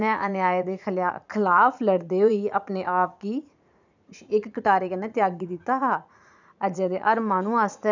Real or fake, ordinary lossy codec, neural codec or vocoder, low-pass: fake; none; vocoder, 44.1 kHz, 80 mel bands, Vocos; 7.2 kHz